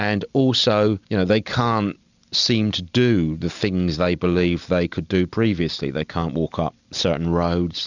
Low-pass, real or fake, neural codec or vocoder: 7.2 kHz; real; none